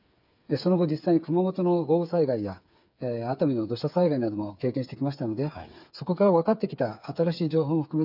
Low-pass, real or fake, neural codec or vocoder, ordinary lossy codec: 5.4 kHz; fake; codec, 16 kHz, 8 kbps, FreqCodec, smaller model; none